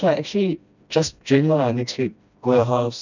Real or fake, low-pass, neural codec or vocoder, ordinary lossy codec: fake; 7.2 kHz; codec, 16 kHz, 1 kbps, FreqCodec, smaller model; none